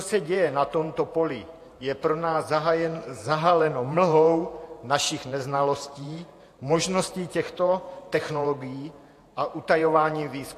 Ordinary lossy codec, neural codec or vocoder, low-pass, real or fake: AAC, 64 kbps; vocoder, 48 kHz, 128 mel bands, Vocos; 14.4 kHz; fake